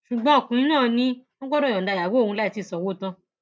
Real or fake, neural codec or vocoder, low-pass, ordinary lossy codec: real; none; none; none